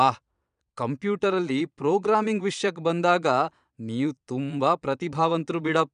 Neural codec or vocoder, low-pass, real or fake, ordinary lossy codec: vocoder, 22.05 kHz, 80 mel bands, Vocos; 9.9 kHz; fake; none